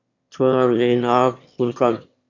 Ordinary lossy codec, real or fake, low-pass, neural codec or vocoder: Opus, 64 kbps; fake; 7.2 kHz; autoencoder, 22.05 kHz, a latent of 192 numbers a frame, VITS, trained on one speaker